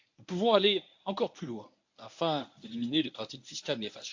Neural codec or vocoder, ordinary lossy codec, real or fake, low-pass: codec, 24 kHz, 0.9 kbps, WavTokenizer, medium speech release version 1; AAC, 48 kbps; fake; 7.2 kHz